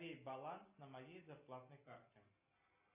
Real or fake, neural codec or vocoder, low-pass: real; none; 3.6 kHz